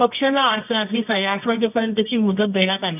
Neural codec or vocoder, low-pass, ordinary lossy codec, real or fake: codec, 24 kHz, 0.9 kbps, WavTokenizer, medium music audio release; 3.6 kHz; none; fake